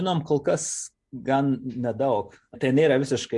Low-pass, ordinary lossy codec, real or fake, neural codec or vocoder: 10.8 kHz; MP3, 64 kbps; real; none